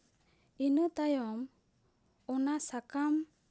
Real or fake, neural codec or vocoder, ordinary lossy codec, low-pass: real; none; none; none